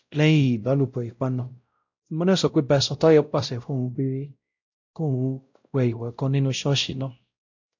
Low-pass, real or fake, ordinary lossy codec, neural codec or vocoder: 7.2 kHz; fake; AAC, 48 kbps; codec, 16 kHz, 0.5 kbps, X-Codec, WavLM features, trained on Multilingual LibriSpeech